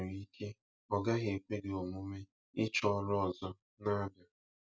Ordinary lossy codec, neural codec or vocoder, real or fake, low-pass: none; none; real; none